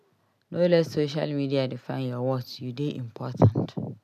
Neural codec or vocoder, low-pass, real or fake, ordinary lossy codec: none; 14.4 kHz; real; none